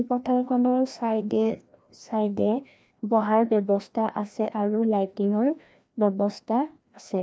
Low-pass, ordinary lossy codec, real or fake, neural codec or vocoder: none; none; fake; codec, 16 kHz, 1 kbps, FreqCodec, larger model